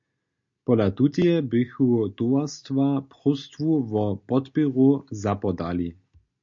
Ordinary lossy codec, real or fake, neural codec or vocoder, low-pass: MP3, 48 kbps; real; none; 7.2 kHz